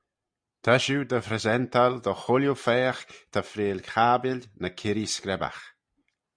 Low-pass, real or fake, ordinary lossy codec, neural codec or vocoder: 9.9 kHz; real; Opus, 64 kbps; none